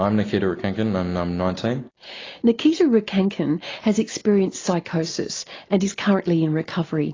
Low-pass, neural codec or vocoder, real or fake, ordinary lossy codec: 7.2 kHz; none; real; AAC, 32 kbps